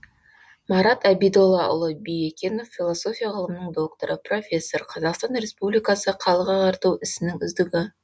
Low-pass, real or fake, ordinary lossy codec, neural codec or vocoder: none; real; none; none